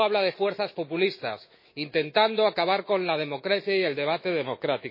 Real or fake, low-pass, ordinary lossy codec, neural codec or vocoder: fake; 5.4 kHz; MP3, 24 kbps; autoencoder, 48 kHz, 128 numbers a frame, DAC-VAE, trained on Japanese speech